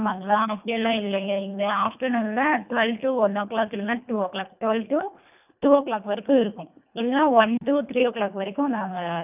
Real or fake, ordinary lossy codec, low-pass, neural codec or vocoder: fake; none; 3.6 kHz; codec, 24 kHz, 1.5 kbps, HILCodec